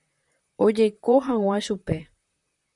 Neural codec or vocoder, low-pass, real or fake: vocoder, 44.1 kHz, 128 mel bands, Pupu-Vocoder; 10.8 kHz; fake